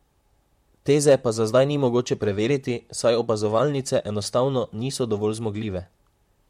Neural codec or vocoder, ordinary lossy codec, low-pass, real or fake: vocoder, 44.1 kHz, 128 mel bands, Pupu-Vocoder; MP3, 64 kbps; 19.8 kHz; fake